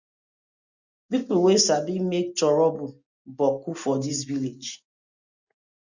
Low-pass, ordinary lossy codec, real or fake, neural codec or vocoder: 7.2 kHz; Opus, 64 kbps; real; none